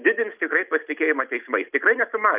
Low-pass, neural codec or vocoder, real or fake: 3.6 kHz; none; real